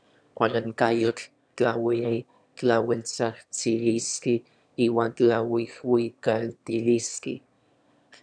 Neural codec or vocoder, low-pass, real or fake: autoencoder, 22.05 kHz, a latent of 192 numbers a frame, VITS, trained on one speaker; 9.9 kHz; fake